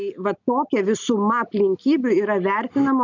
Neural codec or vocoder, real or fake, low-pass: none; real; 7.2 kHz